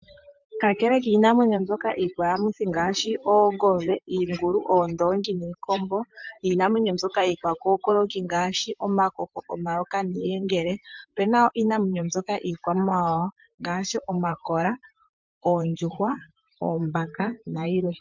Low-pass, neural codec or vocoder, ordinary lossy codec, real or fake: 7.2 kHz; vocoder, 44.1 kHz, 128 mel bands, Pupu-Vocoder; MP3, 64 kbps; fake